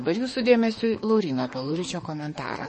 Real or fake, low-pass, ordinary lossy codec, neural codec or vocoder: fake; 10.8 kHz; MP3, 32 kbps; autoencoder, 48 kHz, 32 numbers a frame, DAC-VAE, trained on Japanese speech